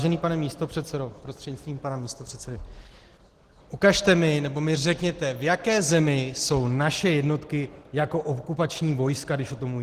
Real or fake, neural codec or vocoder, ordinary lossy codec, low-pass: real; none; Opus, 16 kbps; 14.4 kHz